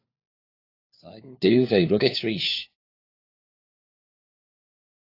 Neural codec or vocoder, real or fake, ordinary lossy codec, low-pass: codec, 16 kHz, 4 kbps, FunCodec, trained on LibriTTS, 50 frames a second; fake; AAC, 32 kbps; 5.4 kHz